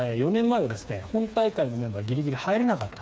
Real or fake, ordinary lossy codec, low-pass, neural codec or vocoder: fake; none; none; codec, 16 kHz, 4 kbps, FreqCodec, smaller model